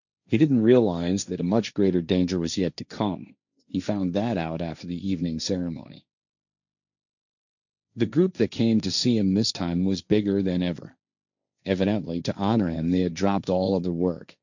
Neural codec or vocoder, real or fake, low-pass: codec, 16 kHz, 1.1 kbps, Voila-Tokenizer; fake; 7.2 kHz